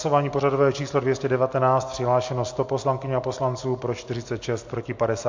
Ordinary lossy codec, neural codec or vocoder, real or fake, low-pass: MP3, 48 kbps; none; real; 7.2 kHz